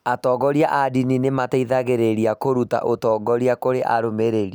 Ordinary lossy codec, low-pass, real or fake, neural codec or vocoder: none; none; real; none